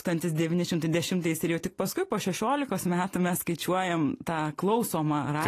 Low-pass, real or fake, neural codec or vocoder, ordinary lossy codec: 14.4 kHz; real; none; AAC, 48 kbps